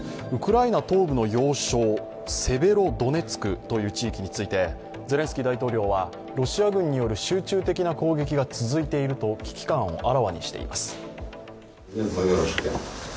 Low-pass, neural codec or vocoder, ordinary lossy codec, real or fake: none; none; none; real